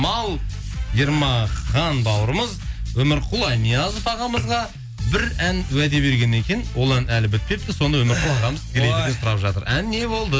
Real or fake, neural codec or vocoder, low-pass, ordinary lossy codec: real; none; none; none